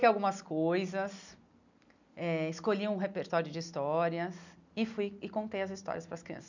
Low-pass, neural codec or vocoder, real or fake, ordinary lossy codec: 7.2 kHz; none; real; none